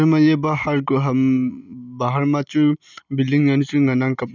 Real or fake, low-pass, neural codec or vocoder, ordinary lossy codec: real; 7.2 kHz; none; none